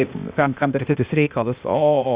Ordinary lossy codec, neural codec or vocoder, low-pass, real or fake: Opus, 64 kbps; codec, 16 kHz, 0.8 kbps, ZipCodec; 3.6 kHz; fake